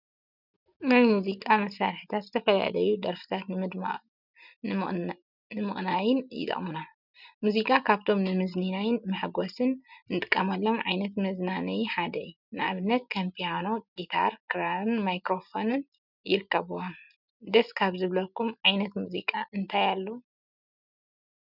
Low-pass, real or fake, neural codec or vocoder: 5.4 kHz; real; none